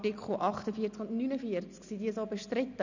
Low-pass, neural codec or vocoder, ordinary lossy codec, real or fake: 7.2 kHz; none; none; real